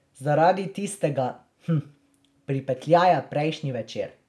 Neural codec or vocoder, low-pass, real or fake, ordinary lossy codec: none; none; real; none